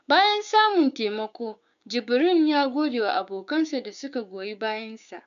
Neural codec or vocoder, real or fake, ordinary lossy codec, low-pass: codec, 16 kHz, 6 kbps, DAC; fake; none; 7.2 kHz